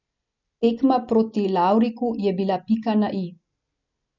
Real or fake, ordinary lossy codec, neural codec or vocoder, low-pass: real; none; none; 7.2 kHz